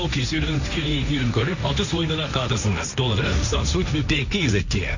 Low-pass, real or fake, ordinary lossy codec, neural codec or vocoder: 7.2 kHz; fake; none; codec, 16 kHz, 1.1 kbps, Voila-Tokenizer